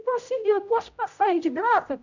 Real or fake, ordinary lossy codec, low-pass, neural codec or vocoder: fake; none; 7.2 kHz; codec, 16 kHz, 0.5 kbps, X-Codec, HuBERT features, trained on general audio